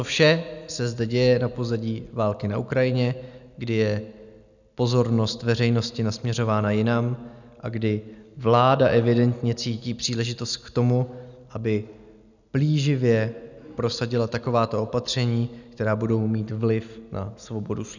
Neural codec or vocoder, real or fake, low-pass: none; real; 7.2 kHz